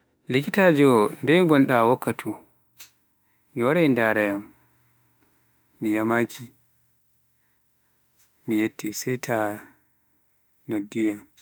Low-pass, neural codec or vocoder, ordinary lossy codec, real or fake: none; autoencoder, 48 kHz, 32 numbers a frame, DAC-VAE, trained on Japanese speech; none; fake